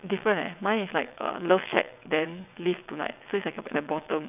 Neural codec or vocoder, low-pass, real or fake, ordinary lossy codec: vocoder, 22.05 kHz, 80 mel bands, WaveNeXt; 3.6 kHz; fake; none